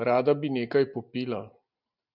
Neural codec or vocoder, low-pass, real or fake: none; 5.4 kHz; real